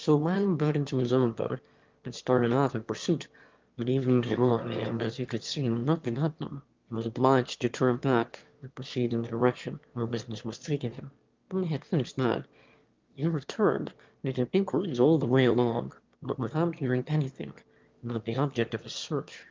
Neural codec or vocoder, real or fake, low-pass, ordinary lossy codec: autoencoder, 22.05 kHz, a latent of 192 numbers a frame, VITS, trained on one speaker; fake; 7.2 kHz; Opus, 32 kbps